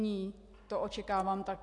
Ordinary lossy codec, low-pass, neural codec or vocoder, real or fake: AAC, 48 kbps; 10.8 kHz; none; real